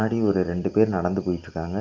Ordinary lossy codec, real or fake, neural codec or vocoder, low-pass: Opus, 32 kbps; real; none; 7.2 kHz